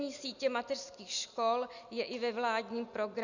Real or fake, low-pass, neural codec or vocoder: real; 7.2 kHz; none